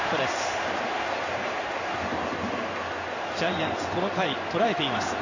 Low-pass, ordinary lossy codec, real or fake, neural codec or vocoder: 7.2 kHz; Opus, 64 kbps; fake; codec, 16 kHz in and 24 kHz out, 1 kbps, XY-Tokenizer